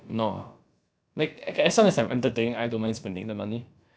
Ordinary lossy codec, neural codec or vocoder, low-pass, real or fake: none; codec, 16 kHz, about 1 kbps, DyCAST, with the encoder's durations; none; fake